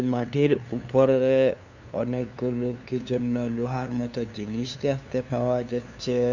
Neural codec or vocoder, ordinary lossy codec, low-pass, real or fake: codec, 16 kHz, 2 kbps, FunCodec, trained on LibriTTS, 25 frames a second; none; 7.2 kHz; fake